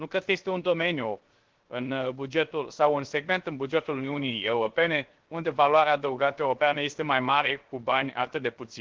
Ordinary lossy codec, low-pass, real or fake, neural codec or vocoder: Opus, 16 kbps; 7.2 kHz; fake; codec, 16 kHz, 0.7 kbps, FocalCodec